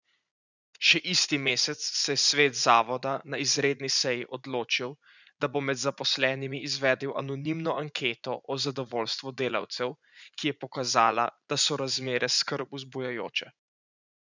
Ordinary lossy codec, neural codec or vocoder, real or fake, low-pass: none; vocoder, 44.1 kHz, 80 mel bands, Vocos; fake; 7.2 kHz